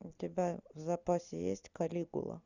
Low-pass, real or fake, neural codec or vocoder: 7.2 kHz; real; none